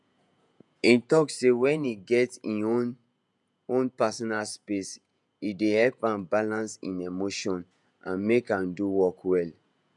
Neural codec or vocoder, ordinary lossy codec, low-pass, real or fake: vocoder, 48 kHz, 128 mel bands, Vocos; none; 10.8 kHz; fake